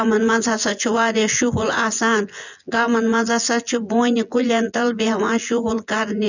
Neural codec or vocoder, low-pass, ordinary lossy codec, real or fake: vocoder, 24 kHz, 100 mel bands, Vocos; 7.2 kHz; none; fake